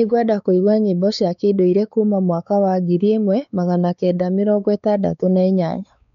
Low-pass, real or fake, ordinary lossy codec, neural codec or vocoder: 7.2 kHz; fake; none; codec, 16 kHz, 4 kbps, X-Codec, WavLM features, trained on Multilingual LibriSpeech